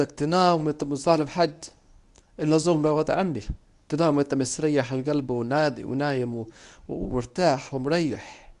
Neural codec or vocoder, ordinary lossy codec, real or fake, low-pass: codec, 24 kHz, 0.9 kbps, WavTokenizer, medium speech release version 1; Opus, 64 kbps; fake; 10.8 kHz